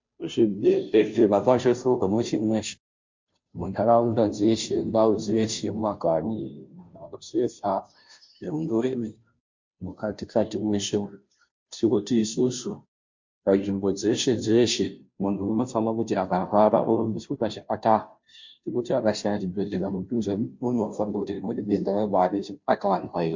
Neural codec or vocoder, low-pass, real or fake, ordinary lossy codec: codec, 16 kHz, 0.5 kbps, FunCodec, trained on Chinese and English, 25 frames a second; 7.2 kHz; fake; MP3, 48 kbps